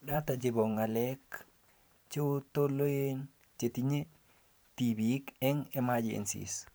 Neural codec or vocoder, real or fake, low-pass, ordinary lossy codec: vocoder, 44.1 kHz, 128 mel bands every 512 samples, BigVGAN v2; fake; none; none